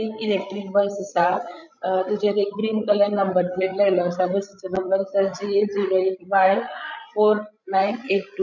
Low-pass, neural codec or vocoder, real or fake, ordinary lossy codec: 7.2 kHz; codec, 16 kHz, 16 kbps, FreqCodec, larger model; fake; none